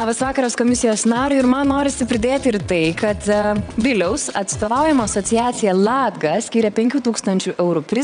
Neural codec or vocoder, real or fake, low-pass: vocoder, 22.05 kHz, 80 mel bands, WaveNeXt; fake; 9.9 kHz